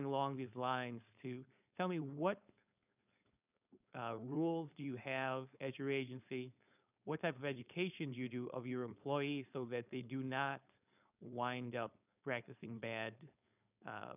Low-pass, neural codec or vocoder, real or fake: 3.6 kHz; codec, 16 kHz, 4.8 kbps, FACodec; fake